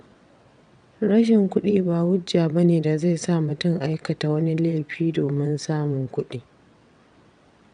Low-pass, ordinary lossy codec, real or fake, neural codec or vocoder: 9.9 kHz; none; fake; vocoder, 22.05 kHz, 80 mel bands, WaveNeXt